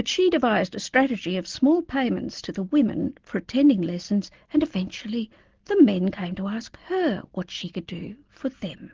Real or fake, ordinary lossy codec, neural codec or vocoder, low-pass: real; Opus, 16 kbps; none; 7.2 kHz